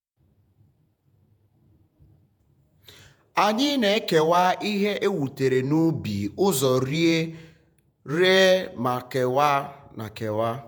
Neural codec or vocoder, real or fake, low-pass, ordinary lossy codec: vocoder, 48 kHz, 128 mel bands, Vocos; fake; none; none